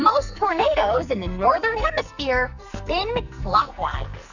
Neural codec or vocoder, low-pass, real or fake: codec, 44.1 kHz, 2.6 kbps, SNAC; 7.2 kHz; fake